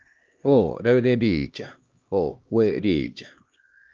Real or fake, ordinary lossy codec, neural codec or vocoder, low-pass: fake; Opus, 24 kbps; codec, 16 kHz, 1 kbps, X-Codec, HuBERT features, trained on LibriSpeech; 7.2 kHz